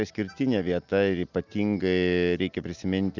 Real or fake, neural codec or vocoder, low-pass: real; none; 7.2 kHz